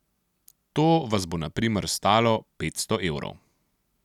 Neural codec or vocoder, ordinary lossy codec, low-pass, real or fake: none; none; 19.8 kHz; real